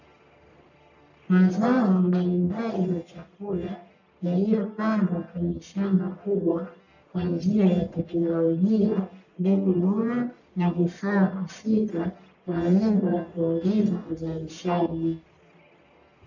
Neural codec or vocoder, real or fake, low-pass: codec, 44.1 kHz, 1.7 kbps, Pupu-Codec; fake; 7.2 kHz